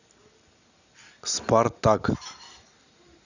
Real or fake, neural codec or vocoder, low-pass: real; none; 7.2 kHz